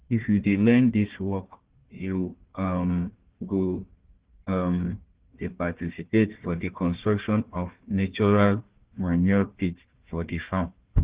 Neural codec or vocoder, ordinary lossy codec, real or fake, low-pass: codec, 16 kHz, 1 kbps, FunCodec, trained on Chinese and English, 50 frames a second; Opus, 16 kbps; fake; 3.6 kHz